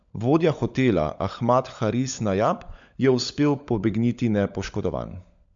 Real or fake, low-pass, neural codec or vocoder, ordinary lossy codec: fake; 7.2 kHz; codec, 16 kHz, 16 kbps, FunCodec, trained on LibriTTS, 50 frames a second; MP3, 64 kbps